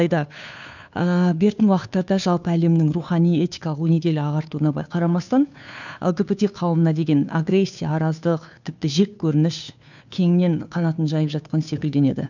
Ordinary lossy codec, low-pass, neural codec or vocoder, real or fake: none; 7.2 kHz; codec, 16 kHz, 2 kbps, FunCodec, trained on Chinese and English, 25 frames a second; fake